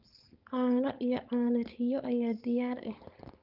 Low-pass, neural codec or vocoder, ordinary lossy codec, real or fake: 5.4 kHz; codec, 16 kHz, 4.8 kbps, FACodec; Opus, 32 kbps; fake